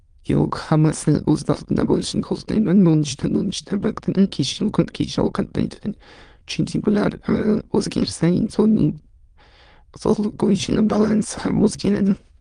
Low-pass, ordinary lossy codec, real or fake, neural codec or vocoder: 9.9 kHz; Opus, 24 kbps; fake; autoencoder, 22.05 kHz, a latent of 192 numbers a frame, VITS, trained on many speakers